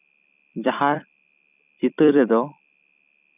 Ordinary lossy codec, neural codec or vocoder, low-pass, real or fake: none; vocoder, 44.1 kHz, 128 mel bands every 256 samples, BigVGAN v2; 3.6 kHz; fake